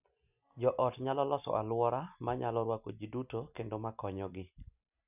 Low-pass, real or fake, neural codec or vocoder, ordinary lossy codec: 3.6 kHz; real; none; none